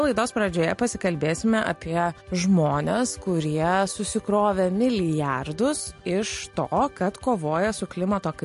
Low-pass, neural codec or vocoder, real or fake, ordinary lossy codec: 14.4 kHz; none; real; MP3, 48 kbps